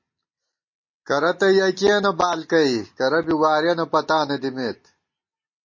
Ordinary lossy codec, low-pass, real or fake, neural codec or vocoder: MP3, 32 kbps; 7.2 kHz; real; none